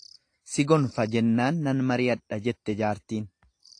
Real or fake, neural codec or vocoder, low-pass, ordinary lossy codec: real; none; 9.9 kHz; AAC, 48 kbps